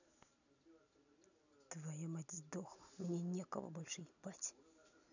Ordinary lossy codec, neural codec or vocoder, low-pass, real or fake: none; none; 7.2 kHz; real